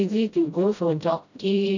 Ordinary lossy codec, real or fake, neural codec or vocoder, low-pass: none; fake; codec, 16 kHz, 0.5 kbps, FreqCodec, smaller model; 7.2 kHz